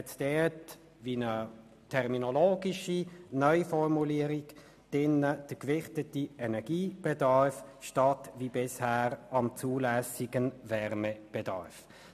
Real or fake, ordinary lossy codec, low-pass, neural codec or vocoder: real; MP3, 96 kbps; 14.4 kHz; none